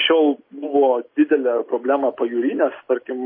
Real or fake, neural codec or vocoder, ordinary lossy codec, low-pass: real; none; MP3, 24 kbps; 5.4 kHz